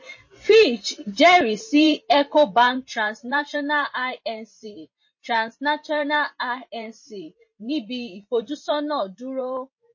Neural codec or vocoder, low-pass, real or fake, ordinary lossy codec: vocoder, 44.1 kHz, 128 mel bands every 512 samples, BigVGAN v2; 7.2 kHz; fake; MP3, 32 kbps